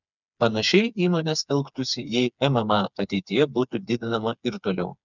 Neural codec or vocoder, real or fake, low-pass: codec, 16 kHz, 4 kbps, FreqCodec, smaller model; fake; 7.2 kHz